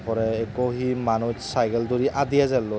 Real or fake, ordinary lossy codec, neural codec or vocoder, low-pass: real; none; none; none